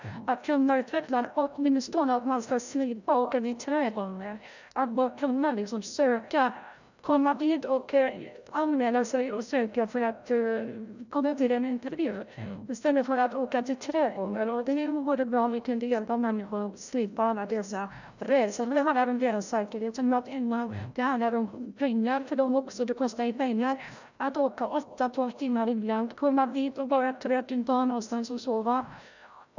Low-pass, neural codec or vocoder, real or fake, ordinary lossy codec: 7.2 kHz; codec, 16 kHz, 0.5 kbps, FreqCodec, larger model; fake; none